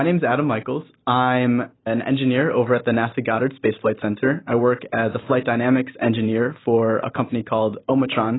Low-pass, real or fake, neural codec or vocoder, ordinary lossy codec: 7.2 kHz; real; none; AAC, 16 kbps